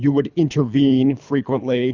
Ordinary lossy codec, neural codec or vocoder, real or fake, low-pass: Opus, 64 kbps; codec, 24 kHz, 3 kbps, HILCodec; fake; 7.2 kHz